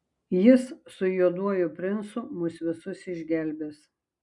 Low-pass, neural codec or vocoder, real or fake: 10.8 kHz; none; real